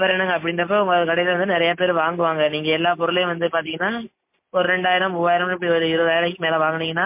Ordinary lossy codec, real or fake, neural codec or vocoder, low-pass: MP3, 24 kbps; real; none; 3.6 kHz